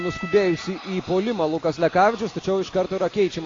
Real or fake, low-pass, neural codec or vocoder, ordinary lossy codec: real; 7.2 kHz; none; AAC, 32 kbps